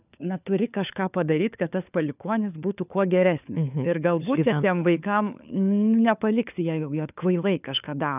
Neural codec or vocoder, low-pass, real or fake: codec, 16 kHz, 4 kbps, FunCodec, trained on LibriTTS, 50 frames a second; 3.6 kHz; fake